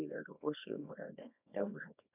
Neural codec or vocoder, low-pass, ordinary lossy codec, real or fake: codec, 24 kHz, 0.9 kbps, WavTokenizer, small release; 3.6 kHz; none; fake